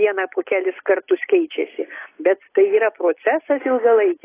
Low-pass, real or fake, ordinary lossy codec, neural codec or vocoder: 3.6 kHz; real; AAC, 16 kbps; none